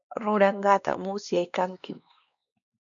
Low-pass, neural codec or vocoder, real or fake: 7.2 kHz; codec, 16 kHz, 2 kbps, X-Codec, WavLM features, trained on Multilingual LibriSpeech; fake